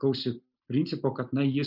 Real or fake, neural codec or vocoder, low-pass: real; none; 5.4 kHz